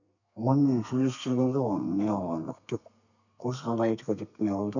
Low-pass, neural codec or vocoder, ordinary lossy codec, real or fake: 7.2 kHz; codec, 32 kHz, 1.9 kbps, SNAC; none; fake